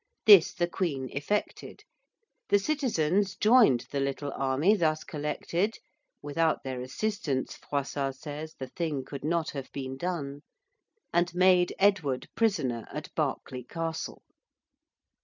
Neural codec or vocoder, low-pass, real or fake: none; 7.2 kHz; real